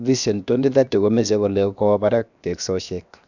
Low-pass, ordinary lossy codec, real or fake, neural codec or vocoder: 7.2 kHz; none; fake; codec, 16 kHz, 0.7 kbps, FocalCodec